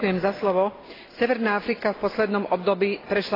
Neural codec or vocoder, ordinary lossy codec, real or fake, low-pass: none; AAC, 24 kbps; real; 5.4 kHz